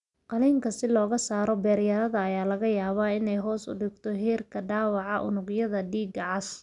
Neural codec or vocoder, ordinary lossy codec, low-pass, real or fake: none; none; 10.8 kHz; real